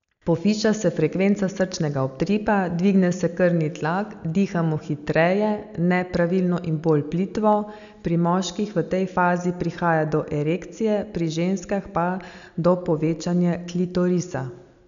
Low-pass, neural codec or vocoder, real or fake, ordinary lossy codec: 7.2 kHz; none; real; none